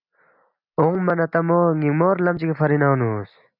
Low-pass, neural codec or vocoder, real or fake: 5.4 kHz; none; real